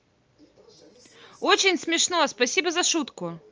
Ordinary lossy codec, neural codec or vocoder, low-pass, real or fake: Opus, 24 kbps; none; 7.2 kHz; real